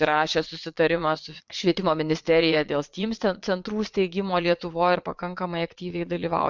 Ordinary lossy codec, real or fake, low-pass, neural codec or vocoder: MP3, 64 kbps; fake; 7.2 kHz; vocoder, 22.05 kHz, 80 mel bands, Vocos